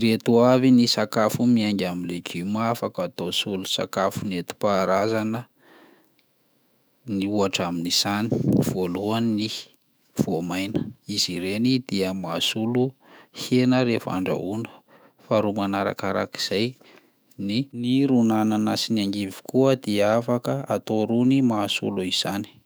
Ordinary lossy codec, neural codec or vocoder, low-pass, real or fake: none; autoencoder, 48 kHz, 128 numbers a frame, DAC-VAE, trained on Japanese speech; none; fake